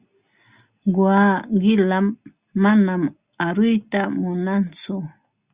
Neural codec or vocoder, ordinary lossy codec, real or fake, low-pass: none; Opus, 64 kbps; real; 3.6 kHz